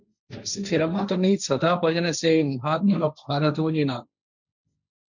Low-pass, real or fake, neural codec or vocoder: 7.2 kHz; fake; codec, 16 kHz, 1.1 kbps, Voila-Tokenizer